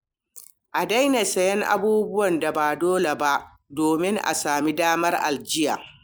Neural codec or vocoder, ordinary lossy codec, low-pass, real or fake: none; none; none; real